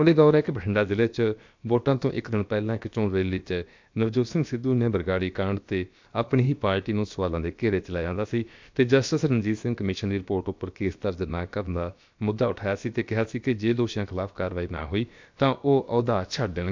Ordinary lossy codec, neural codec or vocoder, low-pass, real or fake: MP3, 64 kbps; codec, 16 kHz, about 1 kbps, DyCAST, with the encoder's durations; 7.2 kHz; fake